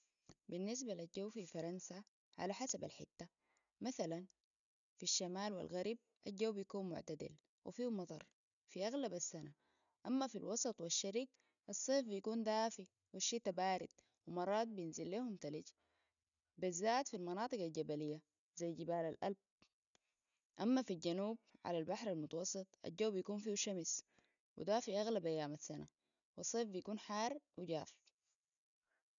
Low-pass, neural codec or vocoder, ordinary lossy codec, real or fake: 7.2 kHz; none; none; real